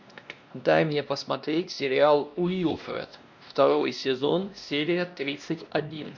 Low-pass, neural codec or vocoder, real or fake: 7.2 kHz; codec, 16 kHz, 1 kbps, X-Codec, WavLM features, trained on Multilingual LibriSpeech; fake